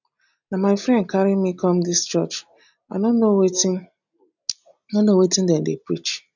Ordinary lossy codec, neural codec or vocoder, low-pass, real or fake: none; none; 7.2 kHz; real